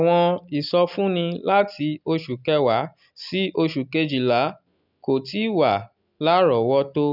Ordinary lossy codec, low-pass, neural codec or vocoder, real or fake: none; 5.4 kHz; none; real